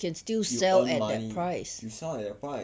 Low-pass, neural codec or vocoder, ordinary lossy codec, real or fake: none; none; none; real